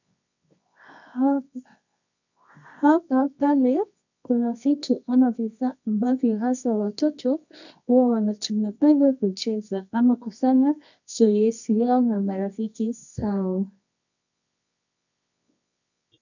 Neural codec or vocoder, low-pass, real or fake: codec, 24 kHz, 0.9 kbps, WavTokenizer, medium music audio release; 7.2 kHz; fake